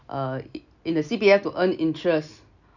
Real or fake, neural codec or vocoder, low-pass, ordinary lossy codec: real; none; 7.2 kHz; none